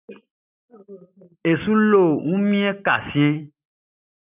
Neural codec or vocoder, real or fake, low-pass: none; real; 3.6 kHz